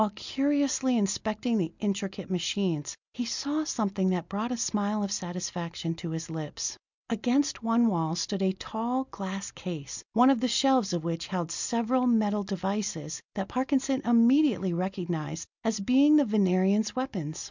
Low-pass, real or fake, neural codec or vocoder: 7.2 kHz; real; none